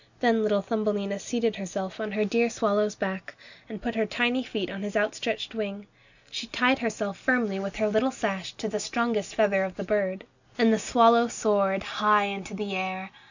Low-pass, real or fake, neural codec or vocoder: 7.2 kHz; real; none